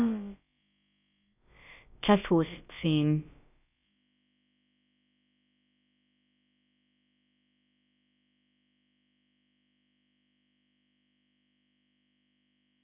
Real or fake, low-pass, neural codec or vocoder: fake; 3.6 kHz; codec, 16 kHz, about 1 kbps, DyCAST, with the encoder's durations